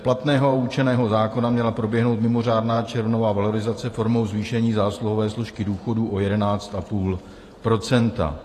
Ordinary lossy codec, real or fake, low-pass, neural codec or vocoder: AAC, 48 kbps; fake; 14.4 kHz; vocoder, 48 kHz, 128 mel bands, Vocos